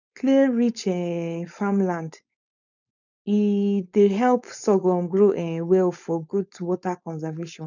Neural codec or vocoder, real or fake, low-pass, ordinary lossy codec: codec, 16 kHz, 4.8 kbps, FACodec; fake; 7.2 kHz; none